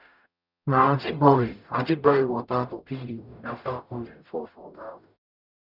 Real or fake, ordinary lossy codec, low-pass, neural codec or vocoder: fake; none; 5.4 kHz; codec, 44.1 kHz, 0.9 kbps, DAC